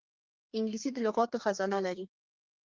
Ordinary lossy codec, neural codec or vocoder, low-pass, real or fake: Opus, 32 kbps; codec, 32 kHz, 1.9 kbps, SNAC; 7.2 kHz; fake